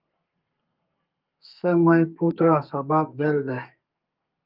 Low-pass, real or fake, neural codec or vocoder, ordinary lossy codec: 5.4 kHz; fake; codec, 32 kHz, 1.9 kbps, SNAC; Opus, 24 kbps